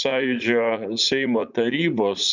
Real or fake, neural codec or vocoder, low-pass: fake; vocoder, 44.1 kHz, 80 mel bands, Vocos; 7.2 kHz